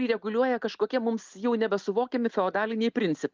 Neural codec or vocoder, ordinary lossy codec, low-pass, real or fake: none; Opus, 24 kbps; 7.2 kHz; real